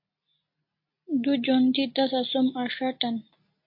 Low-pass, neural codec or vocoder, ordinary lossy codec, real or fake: 5.4 kHz; none; MP3, 32 kbps; real